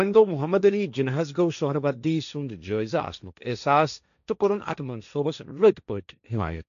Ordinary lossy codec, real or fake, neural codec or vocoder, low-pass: none; fake; codec, 16 kHz, 1.1 kbps, Voila-Tokenizer; 7.2 kHz